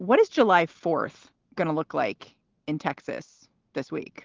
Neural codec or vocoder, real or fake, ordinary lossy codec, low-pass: none; real; Opus, 16 kbps; 7.2 kHz